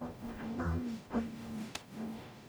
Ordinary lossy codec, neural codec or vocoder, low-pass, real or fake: none; codec, 44.1 kHz, 0.9 kbps, DAC; none; fake